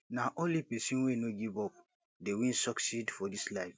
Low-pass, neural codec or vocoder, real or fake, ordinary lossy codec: none; none; real; none